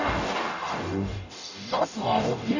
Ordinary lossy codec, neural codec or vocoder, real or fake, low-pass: none; codec, 44.1 kHz, 0.9 kbps, DAC; fake; 7.2 kHz